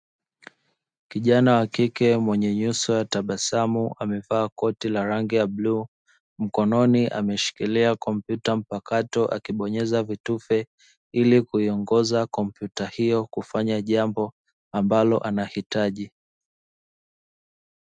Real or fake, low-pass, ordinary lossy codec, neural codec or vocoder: real; 9.9 kHz; MP3, 96 kbps; none